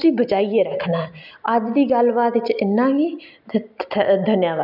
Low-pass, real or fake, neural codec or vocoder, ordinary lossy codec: 5.4 kHz; real; none; none